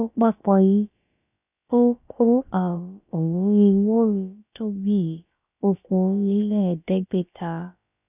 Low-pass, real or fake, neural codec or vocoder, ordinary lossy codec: 3.6 kHz; fake; codec, 16 kHz, about 1 kbps, DyCAST, with the encoder's durations; none